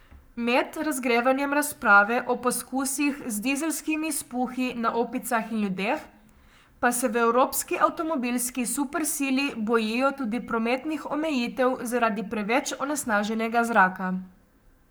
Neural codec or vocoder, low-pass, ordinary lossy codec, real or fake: codec, 44.1 kHz, 7.8 kbps, Pupu-Codec; none; none; fake